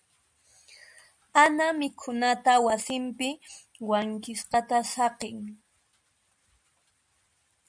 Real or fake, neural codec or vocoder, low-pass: real; none; 9.9 kHz